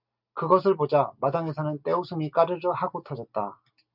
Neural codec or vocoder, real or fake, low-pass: none; real; 5.4 kHz